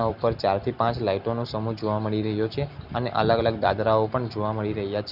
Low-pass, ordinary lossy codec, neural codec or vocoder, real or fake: 5.4 kHz; none; none; real